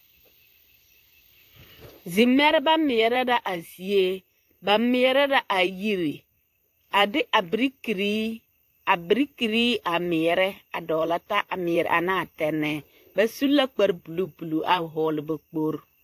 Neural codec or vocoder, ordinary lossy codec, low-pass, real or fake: vocoder, 44.1 kHz, 128 mel bands, Pupu-Vocoder; AAC, 64 kbps; 14.4 kHz; fake